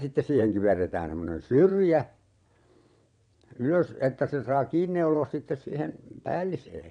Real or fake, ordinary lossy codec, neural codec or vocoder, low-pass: fake; MP3, 96 kbps; vocoder, 22.05 kHz, 80 mel bands, WaveNeXt; 9.9 kHz